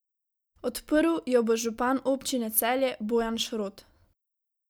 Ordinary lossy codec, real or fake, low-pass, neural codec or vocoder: none; real; none; none